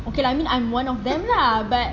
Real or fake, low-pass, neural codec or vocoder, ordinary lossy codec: real; 7.2 kHz; none; AAC, 48 kbps